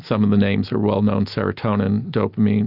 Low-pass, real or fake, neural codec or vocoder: 5.4 kHz; real; none